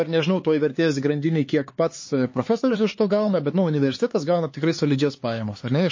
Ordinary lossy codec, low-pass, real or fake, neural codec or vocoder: MP3, 32 kbps; 7.2 kHz; fake; codec, 16 kHz, 4 kbps, X-Codec, HuBERT features, trained on LibriSpeech